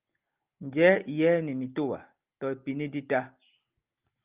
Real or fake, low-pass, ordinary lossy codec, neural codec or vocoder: real; 3.6 kHz; Opus, 24 kbps; none